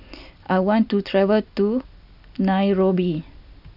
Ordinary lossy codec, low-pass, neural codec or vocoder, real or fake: none; 5.4 kHz; none; real